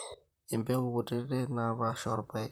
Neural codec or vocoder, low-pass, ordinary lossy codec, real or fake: vocoder, 44.1 kHz, 128 mel bands, Pupu-Vocoder; none; none; fake